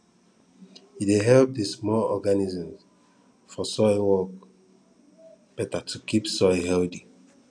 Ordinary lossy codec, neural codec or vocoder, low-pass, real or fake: none; none; 9.9 kHz; real